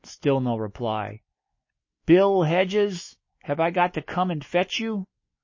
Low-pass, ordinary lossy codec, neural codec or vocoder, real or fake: 7.2 kHz; MP3, 32 kbps; autoencoder, 48 kHz, 128 numbers a frame, DAC-VAE, trained on Japanese speech; fake